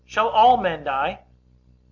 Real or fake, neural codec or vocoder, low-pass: real; none; 7.2 kHz